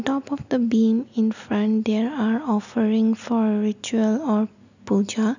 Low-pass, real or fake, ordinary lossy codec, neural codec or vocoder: 7.2 kHz; real; none; none